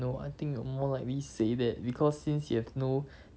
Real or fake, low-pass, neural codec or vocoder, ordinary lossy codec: real; none; none; none